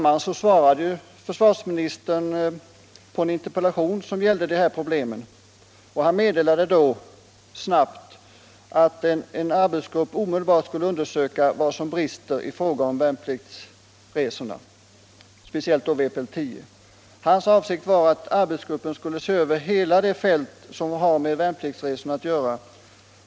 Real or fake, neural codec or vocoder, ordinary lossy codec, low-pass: real; none; none; none